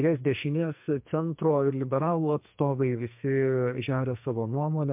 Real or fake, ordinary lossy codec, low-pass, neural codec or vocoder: fake; MP3, 32 kbps; 3.6 kHz; codec, 44.1 kHz, 2.6 kbps, SNAC